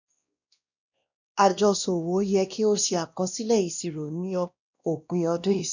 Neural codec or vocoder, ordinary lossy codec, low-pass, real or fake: codec, 16 kHz, 1 kbps, X-Codec, WavLM features, trained on Multilingual LibriSpeech; none; 7.2 kHz; fake